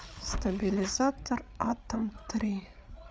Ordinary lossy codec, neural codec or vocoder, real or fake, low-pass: none; codec, 16 kHz, 8 kbps, FreqCodec, larger model; fake; none